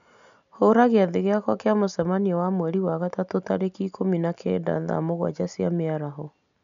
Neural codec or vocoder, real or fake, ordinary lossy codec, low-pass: none; real; none; 7.2 kHz